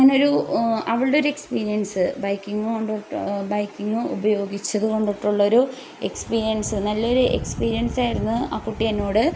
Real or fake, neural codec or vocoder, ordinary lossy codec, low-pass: real; none; none; none